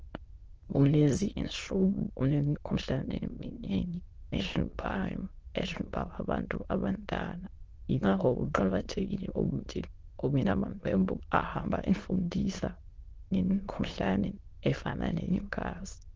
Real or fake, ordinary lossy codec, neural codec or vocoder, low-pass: fake; Opus, 16 kbps; autoencoder, 22.05 kHz, a latent of 192 numbers a frame, VITS, trained on many speakers; 7.2 kHz